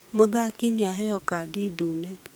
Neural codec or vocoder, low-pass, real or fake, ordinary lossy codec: codec, 44.1 kHz, 2.6 kbps, SNAC; none; fake; none